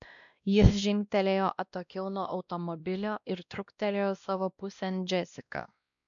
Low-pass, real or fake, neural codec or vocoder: 7.2 kHz; fake; codec, 16 kHz, 1 kbps, X-Codec, WavLM features, trained on Multilingual LibriSpeech